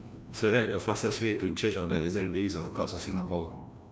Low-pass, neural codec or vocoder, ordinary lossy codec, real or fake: none; codec, 16 kHz, 1 kbps, FreqCodec, larger model; none; fake